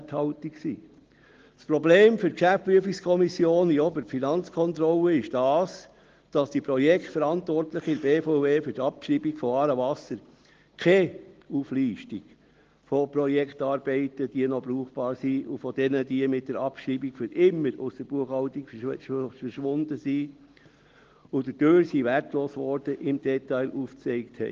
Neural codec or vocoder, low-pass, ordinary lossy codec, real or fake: none; 7.2 kHz; Opus, 16 kbps; real